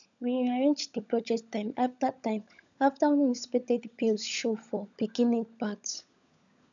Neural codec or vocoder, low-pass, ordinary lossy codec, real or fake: codec, 16 kHz, 16 kbps, FunCodec, trained on LibriTTS, 50 frames a second; 7.2 kHz; none; fake